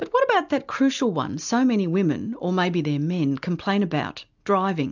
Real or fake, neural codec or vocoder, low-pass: real; none; 7.2 kHz